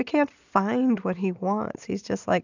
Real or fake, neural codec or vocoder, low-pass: real; none; 7.2 kHz